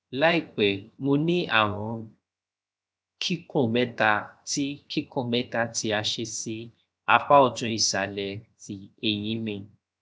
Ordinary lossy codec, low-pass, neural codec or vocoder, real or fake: none; none; codec, 16 kHz, 0.7 kbps, FocalCodec; fake